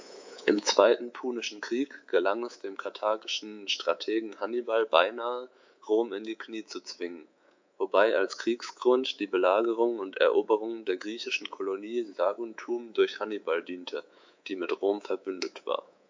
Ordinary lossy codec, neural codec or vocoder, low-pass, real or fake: MP3, 64 kbps; codec, 24 kHz, 3.1 kbps, DualCodec; 7.2 kHz; fake